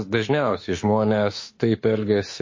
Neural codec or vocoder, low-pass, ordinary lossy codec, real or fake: autoencoder, 48 kHz, 32 numbers a frame, DAC-VAE, trained on Japanese speech; 7.2 kHz; MP3, 32 kbps; fake